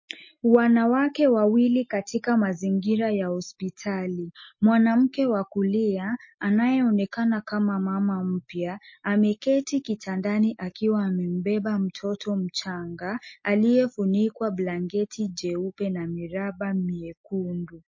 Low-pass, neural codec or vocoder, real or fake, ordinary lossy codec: 7.2 kHz; none; real; MP3, 32 kbps